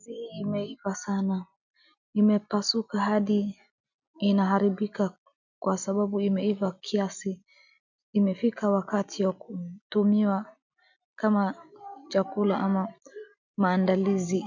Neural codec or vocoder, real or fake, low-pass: none; real; 7.2 kHz